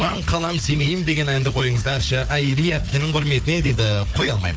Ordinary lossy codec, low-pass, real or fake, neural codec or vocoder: none; none; fake; codec, 16 kHz, 4 kbps, FunCodec, trained on Chinese and English, 50 frames a second